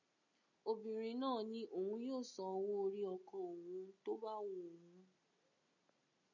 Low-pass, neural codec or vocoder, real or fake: 7.2 kHz; none; real